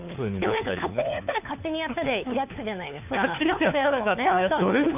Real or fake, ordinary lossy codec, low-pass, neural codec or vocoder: fake; none; 3.6 kHz; codec, 16 kHz, 4 kbps, FunCodec, trained on LibriTTS, 50 frames a second